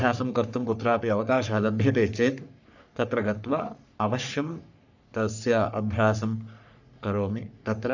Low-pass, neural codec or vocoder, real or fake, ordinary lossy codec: 7.2 kHz; codec, 44.1 kHz, 3.4 kbps, Pupu-Codec; fake; none